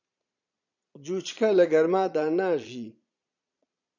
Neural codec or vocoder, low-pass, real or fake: vocoder, 22.05 kHz, 80 mel bands, Vocos; 7.2 kHz; fake